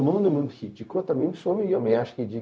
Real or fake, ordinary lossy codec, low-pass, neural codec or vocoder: fake; none; none; codec, 16 kHz, 0.4 kbps, LongCat-Audio-Codec